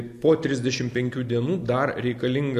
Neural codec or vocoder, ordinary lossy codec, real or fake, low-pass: none; MP3, 96 kbps; real; 14.4 kHz